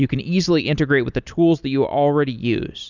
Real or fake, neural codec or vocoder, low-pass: real; none; 7.2 kHz